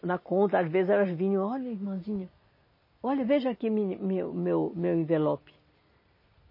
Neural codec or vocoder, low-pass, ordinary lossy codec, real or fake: none; 5.4 kHz; MP3, 24 kbps; real